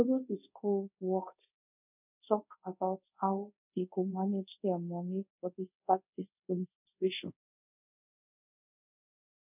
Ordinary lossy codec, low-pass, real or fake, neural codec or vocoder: none; 3.6 kHz; fake; codec, 24 kHz, 0.5 kbps, DualCodec